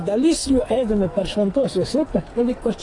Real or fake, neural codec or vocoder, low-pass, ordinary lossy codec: fake; codec, 44.1 kHz, 2.6 kbps, SNAC; 10.8 kHz; AAC, 32 kbps